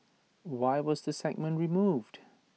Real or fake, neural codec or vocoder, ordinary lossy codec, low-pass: real; none; none; none